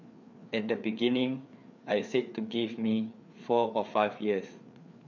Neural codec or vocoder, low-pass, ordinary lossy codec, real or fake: codec, 16 kHz, 4 kbps, FreqCodec, larger model; 7.2 kHz; MP3, 64 kbps; fake